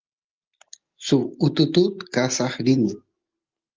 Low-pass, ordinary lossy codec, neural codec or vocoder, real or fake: 7.2 kHz; Opus, 32 kbps; none; real